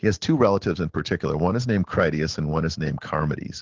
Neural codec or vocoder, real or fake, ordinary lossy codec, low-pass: none; real; Opus, 16 kbps; 7.2 kHz